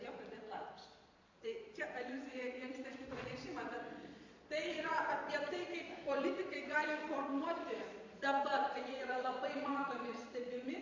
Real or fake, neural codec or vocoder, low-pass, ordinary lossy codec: fake; vocoder, 22.05 kHz, 80 mel bands, Vocos; 7.2 kHz; MP3, 48 kbps